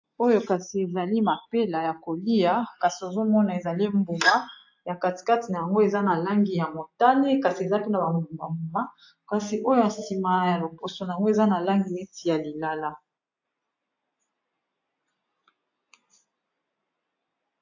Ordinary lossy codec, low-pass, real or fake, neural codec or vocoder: AAC, 48 kbps; 7.2 kHz; real; none